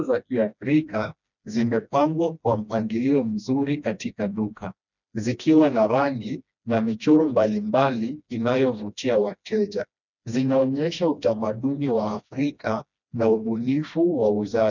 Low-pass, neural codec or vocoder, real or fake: 7.2 kHz; codec, 16 kHz, 1 kbps, FreqCodec, smaller model; fake